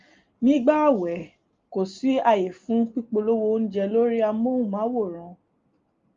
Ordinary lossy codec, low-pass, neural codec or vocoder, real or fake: Opus, 32 kbps; 7.2 kHz; none; real